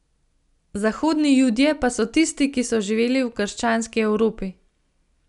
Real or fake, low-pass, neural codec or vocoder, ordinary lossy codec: real; 10.8 kHz; none; none